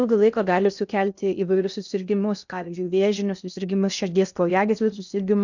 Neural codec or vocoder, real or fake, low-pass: codec, 16 kHz in and 24 kHz out, 0.6 kbps, FocalCodec, streaming, 2048 codes; fake; 7.2 kHz